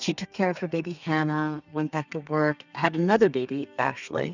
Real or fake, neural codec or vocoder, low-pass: fake; codec, 32 kHz, 1.9 kbps, SNAC; 7.2 kHz